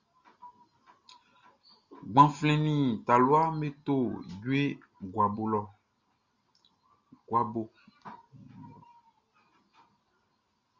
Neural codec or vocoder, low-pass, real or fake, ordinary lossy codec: none; 7.2 kHz; real; Opus, 64 kbps